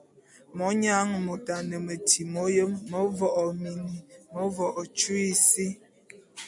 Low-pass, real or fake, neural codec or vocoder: 10.8 kHz; real; none